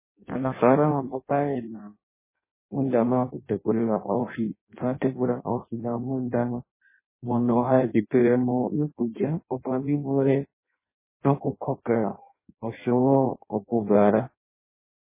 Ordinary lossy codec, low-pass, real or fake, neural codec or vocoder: MP3, 16 kbps; 3.6 kHz; fake; codec, 16 kHz in and 24 kHz out, 0.6 kbps, FireRedTTS-2 codec